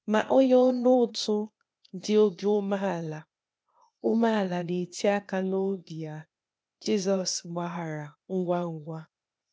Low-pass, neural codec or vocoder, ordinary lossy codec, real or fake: none; codec, 16 kHz, 0.8 kbps, ZipCodec; none; fake